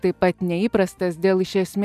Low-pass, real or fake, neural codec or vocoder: 14.4 kHz; real; none